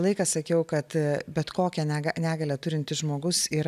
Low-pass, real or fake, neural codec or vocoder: 14.4 kHz; real; none